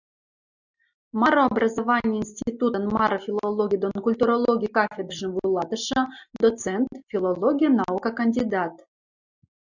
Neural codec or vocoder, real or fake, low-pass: none; real; 7.2 kHz